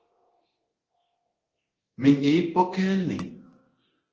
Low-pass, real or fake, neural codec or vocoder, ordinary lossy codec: 7.2 kHz; fake; codec, 24 kHz, 0.9 kbps, DualCodec; Opus, 16 kbps